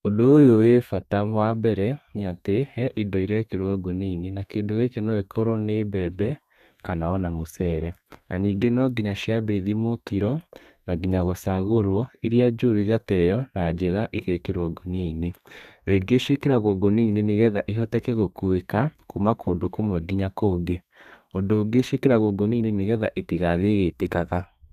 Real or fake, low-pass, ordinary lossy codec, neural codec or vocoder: fake; 14.4 kHz; none; codec, 32 kHz, 1.9 kbps, SNAC